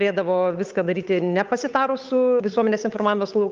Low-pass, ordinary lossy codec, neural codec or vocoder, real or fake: 7.2 kHz; Opus, 24 kbps; codec, 16 kHz, 8 kbps, FunCodec, trained on Chinese and English, 25 frames a second; fake